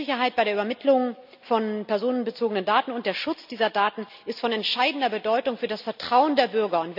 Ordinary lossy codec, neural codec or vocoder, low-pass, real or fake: none; none; 5.4 kHz; real